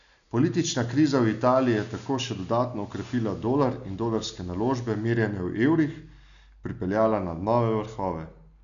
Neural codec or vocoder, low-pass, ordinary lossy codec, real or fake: none; 7.2 kHz; none; real